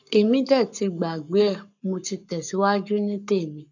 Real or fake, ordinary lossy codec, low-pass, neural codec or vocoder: fake; none; 7.2 kHz; codec, 44.1 kHz, 7.8 kbps, Pupu-Codec